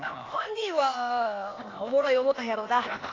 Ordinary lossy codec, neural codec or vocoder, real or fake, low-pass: AAC, 32 kbps; codec, 16 kHz, 0.8 kbps, ZipCodec; fake; 7.2 kHz